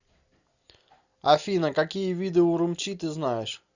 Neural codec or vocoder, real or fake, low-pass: none; real; 7.2 kHz